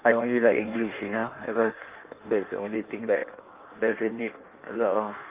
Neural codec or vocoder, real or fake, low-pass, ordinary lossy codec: codec, 16 kHz in and 24 kHz out, 1.1 kbps, FireRedTTS-2 codec; fake; 3.6 kHz; Opus, 32 kbps